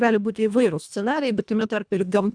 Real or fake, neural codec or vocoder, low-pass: fake; codec, 24 kHz, 1.5 kbps, HILCodec; 9.9 kHz